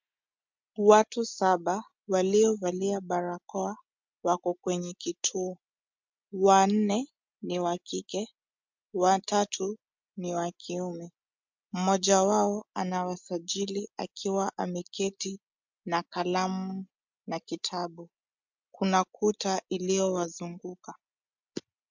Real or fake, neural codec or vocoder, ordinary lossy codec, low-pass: real; none; MP3, 64 kbps; 7.2 kHz